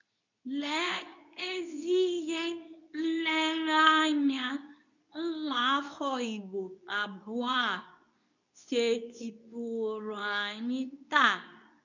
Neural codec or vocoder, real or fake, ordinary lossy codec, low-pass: codec, 24 kHz, 0.9 kbps, WavTokenizer, medium speech release version 2; fake; none; 7.2 kHz